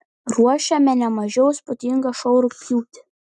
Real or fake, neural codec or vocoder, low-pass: real; none; 10.8 kHz